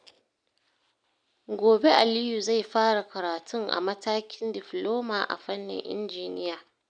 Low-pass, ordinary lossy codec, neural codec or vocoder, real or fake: 9.9 kHz; none; none; real